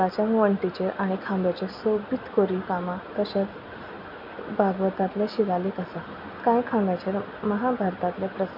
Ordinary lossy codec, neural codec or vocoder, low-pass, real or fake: none; none; 5.4 kHz; real